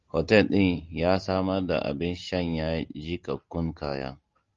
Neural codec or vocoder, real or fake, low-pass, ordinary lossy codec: none; real; 7.2 kHz; Opus, 32 kbps